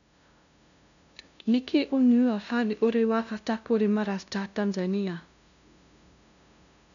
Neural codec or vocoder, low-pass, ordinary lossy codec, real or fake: codec, 16 kHz, 0.5 kbps, FunCodec, trained on LibriTTS, 25 frames a second; 7.2 kHz; none; fake